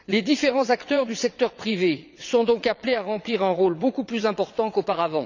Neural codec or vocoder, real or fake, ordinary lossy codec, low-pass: vocoder, 22.05 kHz, 80 mel bands, WaveNeXt; fake; none; 7.2 kHz